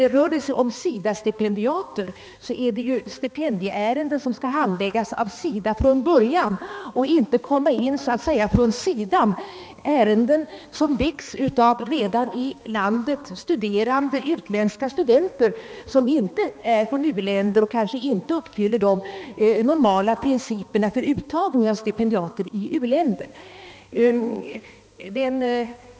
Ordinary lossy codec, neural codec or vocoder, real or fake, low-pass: none; codec, 16 kHz, 2 kbps, X-Codec, HuBERT features, trained on balanced general audio; fake; none